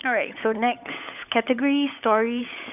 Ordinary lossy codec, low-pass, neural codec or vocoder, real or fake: none; 3.6 kHz; codec, 16 kHz, 16 kbps, FunCodec, trained on LibriTTS, 50 frames a second; fake